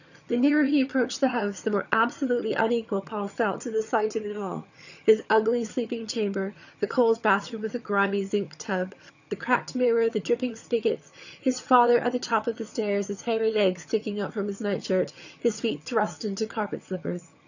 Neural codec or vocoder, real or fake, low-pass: vocoder, 22.05 kHz, 80 mel bands, HiFi-GAN; fake; 7.2 kHz